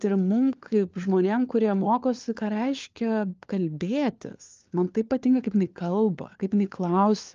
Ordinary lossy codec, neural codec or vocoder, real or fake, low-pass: Opus, 24 kbps; codec, 16 kHz, 4 kbps, FunCodec, trained on LibriTTS, 50 frames a second; fake; 7.2 kHz